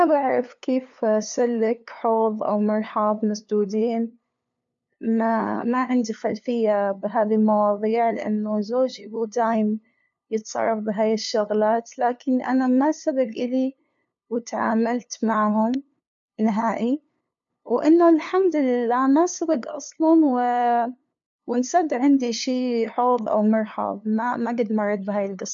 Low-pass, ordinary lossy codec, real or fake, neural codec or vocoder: 7.2 kHz; MP3, 64 kbps; fake; codec, 16 kHz, 2 kbps, FunCodec, trained on LibriTTS, 25 frames a second